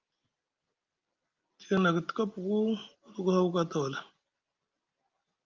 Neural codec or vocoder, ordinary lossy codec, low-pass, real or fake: none; Opus, 24 kbps; 7.2 kHz; real